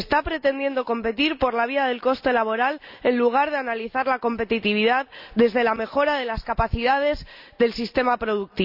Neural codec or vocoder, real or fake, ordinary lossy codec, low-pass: none; real; none; 5.4 kHz